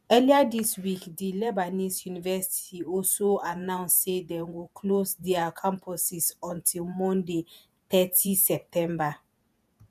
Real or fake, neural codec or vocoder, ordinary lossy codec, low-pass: fake; vocoder, 48 kHz, 128 mel bands, Vocos; none; 14.4 kHz